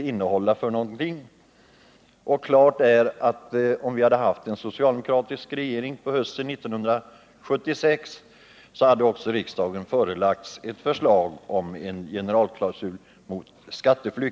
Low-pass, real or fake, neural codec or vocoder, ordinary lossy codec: none; real; none; none